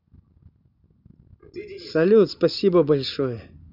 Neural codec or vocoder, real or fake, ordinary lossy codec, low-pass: none; real; none; 5.4 kHz